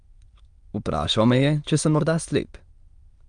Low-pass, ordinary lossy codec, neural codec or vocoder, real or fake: 9.9 kHz; Opus, 32 kbps; autoencoder, 22.05 kHz, a latent of 192 numbers a frame, VITS, trained on many speakers; fake